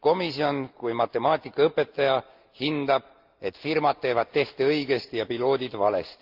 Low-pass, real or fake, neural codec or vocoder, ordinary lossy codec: 5.4 kHz; real; none; Opus, 24 kbps